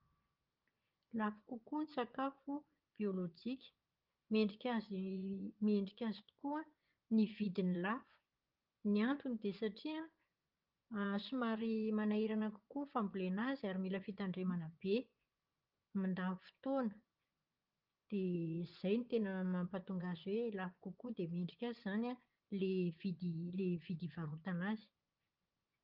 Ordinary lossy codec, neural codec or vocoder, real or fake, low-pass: Opus, 32 kbps; vocoder, 24 kHz, 100 mel bands, Vocos; fake; 5.4 kHz